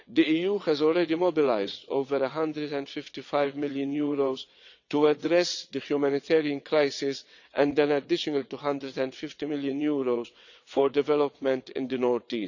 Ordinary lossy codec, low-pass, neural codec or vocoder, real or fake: none; 7.2 kHz; vocoder, 22.05 kHz, 80 mel bands, WaveNeXt; fake